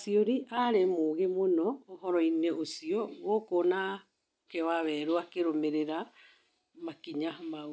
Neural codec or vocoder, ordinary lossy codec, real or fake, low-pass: none; none; real; none